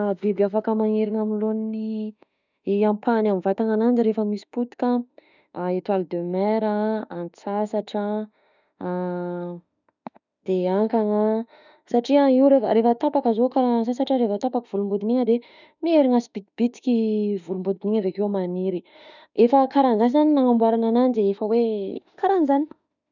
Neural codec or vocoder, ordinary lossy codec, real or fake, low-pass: autoencoder, 48 kHz, 32 numbers a frame, DAC-VAE, trained on Japanese speech; none; fake; 7.2 kHz